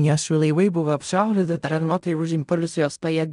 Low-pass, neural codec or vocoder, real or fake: 10.8 kHz; codec, 16 kHz in and 24 kHz out, 0.4 kbps, LongCat-Audio-Codec, fine tuned four codebook decoder; fake